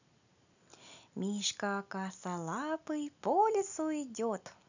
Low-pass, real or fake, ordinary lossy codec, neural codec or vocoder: 7.2 kHz; real; none; none